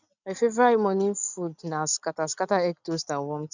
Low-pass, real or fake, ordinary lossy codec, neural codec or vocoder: 7.2 kHz; real; none; none